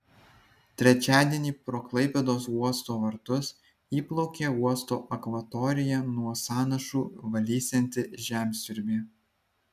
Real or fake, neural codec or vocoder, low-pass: real; none; 14.4 kHz